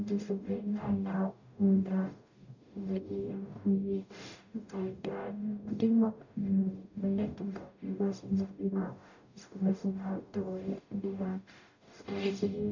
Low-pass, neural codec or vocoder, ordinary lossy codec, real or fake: 7.2 kHz; codec, 44.1 kHz, 0.9 kbps, DAC; none; fake